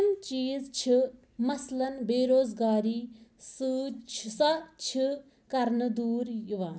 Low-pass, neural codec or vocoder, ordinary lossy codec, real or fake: none; none; none; real